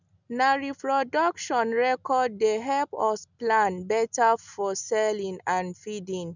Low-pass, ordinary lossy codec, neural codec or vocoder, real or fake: 7.2 kHz; none; none; real